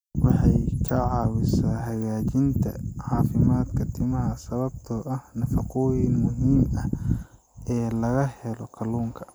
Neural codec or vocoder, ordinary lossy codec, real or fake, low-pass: none; none; real; none